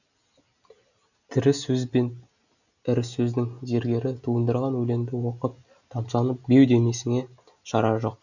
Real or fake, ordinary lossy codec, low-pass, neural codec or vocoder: real; none; 7.2 kHz; none